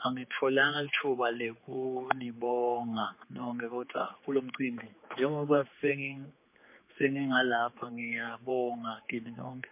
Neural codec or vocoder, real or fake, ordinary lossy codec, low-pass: codec, 16 kHz, 4 kbps, X-Codec, HuBERT features, trained on general audio; fake; MP3, 24 kbps; 3.6 kHz